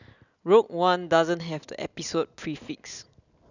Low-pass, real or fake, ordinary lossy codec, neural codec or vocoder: 7.2 kHz; real; none; none